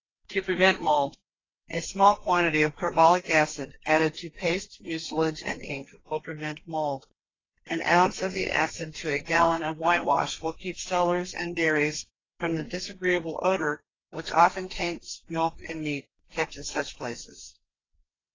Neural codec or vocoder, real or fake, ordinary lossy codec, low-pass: codec, 32 kHz, 1.9 kbps, SNAC; fake; AAC, 32 kbps; 7.2 kHz